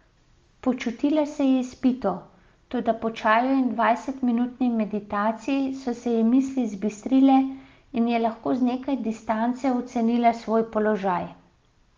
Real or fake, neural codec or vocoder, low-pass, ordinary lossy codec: real; none; 7.2 kHz; Opus, 32 kbps